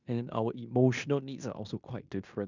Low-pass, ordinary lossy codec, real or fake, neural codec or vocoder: 7.2 kHz; none; fake; codec, 16 kHz in and 24 kHz out, 0.9 kbps, LongCat-Audio-Codec, fine tuned four codebook decoder